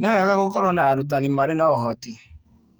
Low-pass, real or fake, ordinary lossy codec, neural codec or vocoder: none; fake; none; codec, 44.1 kHz, 2.6 kbps, SNAC